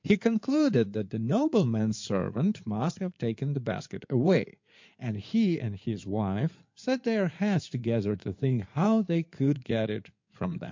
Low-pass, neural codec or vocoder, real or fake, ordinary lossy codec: 7.2 kHz; codec, 16 kHz in and 24 kHz out, 2.2 kbps, FireRedTTS-2 codec; fake; MP3, 48 kbps